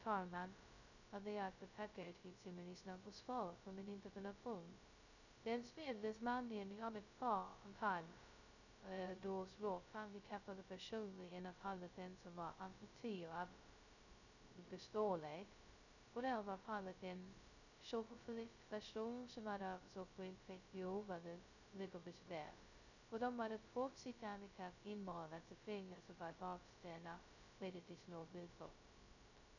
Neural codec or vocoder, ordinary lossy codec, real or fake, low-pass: codec, 16 kHz, 0.2 kbps, FocalCodec; none; fake; 7.2 kHz